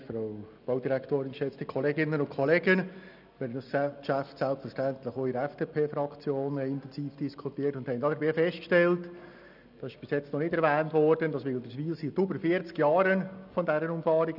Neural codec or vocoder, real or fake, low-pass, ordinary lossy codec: none; real; 5.4 kHz; none